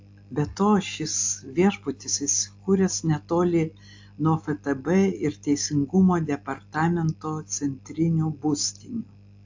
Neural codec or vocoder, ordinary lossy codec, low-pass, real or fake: none; AAC, 48 kbps; 7.2 kHz; real